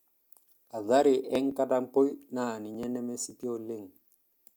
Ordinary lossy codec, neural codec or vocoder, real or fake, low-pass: none; none; real; none